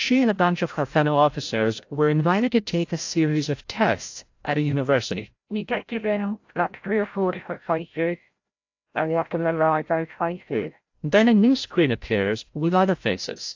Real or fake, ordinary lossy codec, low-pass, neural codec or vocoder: fake; AAC, 48 kbps; 7.2 kHz; codec, 16 kHz, 0.5 kbps, FreqCodec, larger model